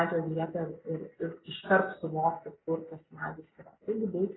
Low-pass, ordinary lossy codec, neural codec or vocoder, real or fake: 7.2 kHz; AAC, 16 kbps; none; real